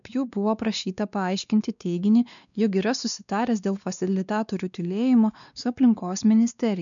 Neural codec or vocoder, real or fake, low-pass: codec, 16 kHz, 2 kbps, X-Codec, WavLM features, trained on Multilingual LibriSpeech; fake; 7.2 kHz